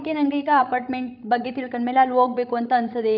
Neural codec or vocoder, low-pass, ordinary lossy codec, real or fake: codec, 16 kHz, 16 kbps, FreqCodec, larger model; 5.4 kHz; none; fake